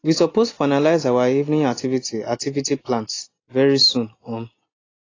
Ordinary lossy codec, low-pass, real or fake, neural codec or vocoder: AAC, 32 kbps; 7.2 kHz; real; none